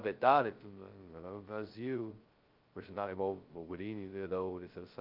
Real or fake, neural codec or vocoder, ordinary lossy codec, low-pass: fake; codec, 16 kHz, 0.2 kbps, FocalCodec; Opus, 32 kbps; 5.4 kHz